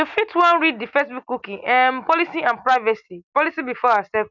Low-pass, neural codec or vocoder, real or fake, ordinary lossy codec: 7.2 kHz; none; real; none